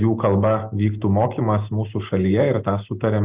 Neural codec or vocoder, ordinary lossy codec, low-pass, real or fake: none; Opus, 32 kbps; 3.6 kHz; real